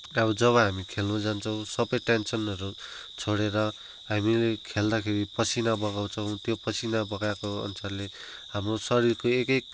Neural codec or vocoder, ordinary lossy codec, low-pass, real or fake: none; none; none; real